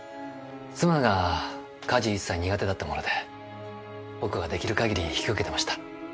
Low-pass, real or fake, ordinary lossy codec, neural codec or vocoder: none; real; none; none